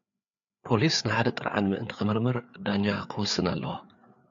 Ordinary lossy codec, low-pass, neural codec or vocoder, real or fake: AAC, 64 kbps; 7.2 kHz; codec, 16 kHz, 4 kbps, FreqCodec, larger model; fake